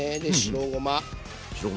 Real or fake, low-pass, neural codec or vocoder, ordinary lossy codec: real; none; none; none